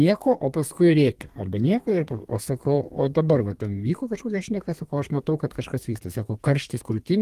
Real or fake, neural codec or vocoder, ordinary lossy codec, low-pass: fake; codec, 32 kHz, 1.9 kbps, SNAC; Opus, 24 kbps; 14.4 kHz